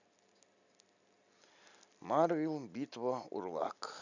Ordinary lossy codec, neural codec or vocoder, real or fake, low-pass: none; none; real; 7.2 kHz